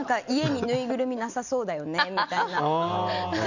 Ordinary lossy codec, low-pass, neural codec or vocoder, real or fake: none; 7.2 kHz; none; real